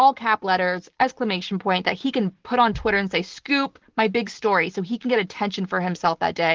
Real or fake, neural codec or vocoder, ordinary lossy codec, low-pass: real; none; Opus, 16 kbps; 7.2 kHz